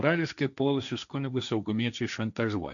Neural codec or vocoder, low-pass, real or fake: codec, 16 kHz, 1.1 kbps, Voila-Tokenizer; 7.2 kHz; fake